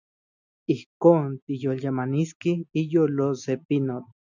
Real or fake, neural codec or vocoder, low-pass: real; none; 7.2 kHz